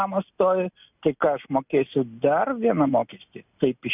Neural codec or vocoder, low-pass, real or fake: none; 3.6 kHz; real